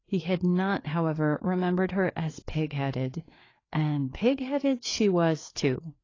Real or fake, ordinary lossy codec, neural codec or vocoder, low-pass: fake; AAC, 32 kbps; codec, 16 kHz, 4 kbps, FreqCodec, larger model; 7.2 kHz